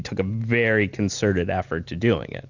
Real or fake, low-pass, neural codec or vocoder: real; 7.2 kHz; none